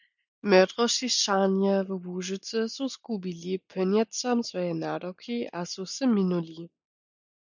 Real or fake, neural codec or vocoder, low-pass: real; none; 7.2 kHz